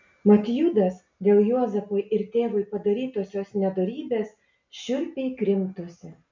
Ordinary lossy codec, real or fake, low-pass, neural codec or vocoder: MP3, 64 kbps; real; 7.2 kHz; none